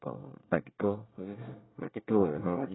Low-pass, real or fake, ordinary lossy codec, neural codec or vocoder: 7.2 kHz; fake; AAC, 16 kbps; codec, 24 kHz, 1 kbps, SNAC